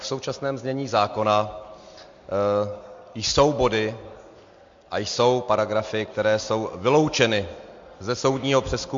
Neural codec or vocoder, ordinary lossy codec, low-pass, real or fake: none; MP3, 48 kbps; 7.2 kHz; real